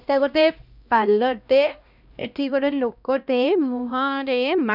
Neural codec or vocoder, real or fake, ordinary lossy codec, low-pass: codec, 16 kHz, 1 kbps, X-Codec, HuBERT features, trained on LibriSpeech; fake; none; 5.4 kHz